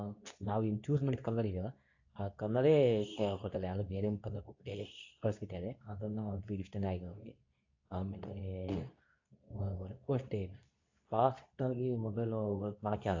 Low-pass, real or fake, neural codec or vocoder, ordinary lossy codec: 7.2 kHz; fake; codec, 24 kHz, 0.9 kbps, WavTokenizer, medium speech release version 2; AAC, 48 kbps